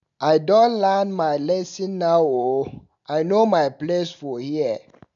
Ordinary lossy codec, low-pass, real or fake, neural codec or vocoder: none; 7.2 kHz; real; none